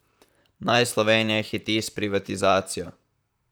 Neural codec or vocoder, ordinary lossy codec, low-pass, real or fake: vocoder, 44.1 kHz, 128 mel bands, Pupu-Vocoder; none; none; fake